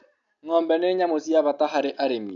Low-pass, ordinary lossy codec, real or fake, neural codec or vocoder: 7.2 kHz; none; real; none